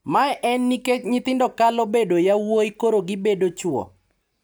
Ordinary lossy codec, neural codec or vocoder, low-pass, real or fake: none; none; none; real